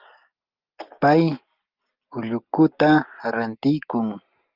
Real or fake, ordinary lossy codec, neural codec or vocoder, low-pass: real; Opus, 24 kbps; none; 5.4 kHz